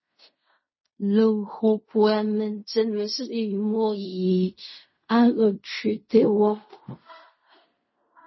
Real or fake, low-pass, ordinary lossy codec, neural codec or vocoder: fake; 7.2 kHz; MP3, 24 kbps; codec, 16 kHz in and 24 kHz out, 0.4 kbps, LongCat-Audio-Codec, fine tuned four codebook decoder